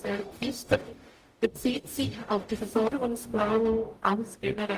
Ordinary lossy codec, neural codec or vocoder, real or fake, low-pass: Opus, 24 kbps; codec, 44.1 kHz, 0.9 kbps, DAC; fake; 14.4 kHz